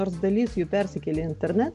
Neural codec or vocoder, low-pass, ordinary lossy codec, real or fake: none; 10.8 kHz; MP3, 96 kbps; real